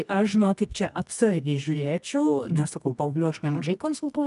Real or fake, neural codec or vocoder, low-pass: fake; codec, 24 kHz, 0.9 kbps, WavTokenizer, medium music audio release; 10.8 kHz